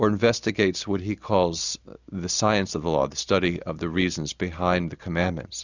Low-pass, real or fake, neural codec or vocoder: 7.2 kHz; real; none